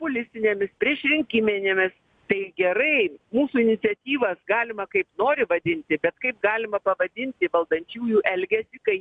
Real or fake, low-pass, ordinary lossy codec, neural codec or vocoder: real; 9.9 kHz; MP3, 96 kbps; none